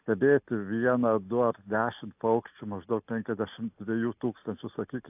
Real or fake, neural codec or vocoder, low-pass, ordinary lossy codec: real; none; 3.6 kHz; AAC, 32 kbps